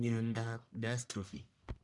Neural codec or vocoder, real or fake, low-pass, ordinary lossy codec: codec, 44.1 kHz, 1.7 kbps, Pupu-Codec; fake; 10.8 kHz; none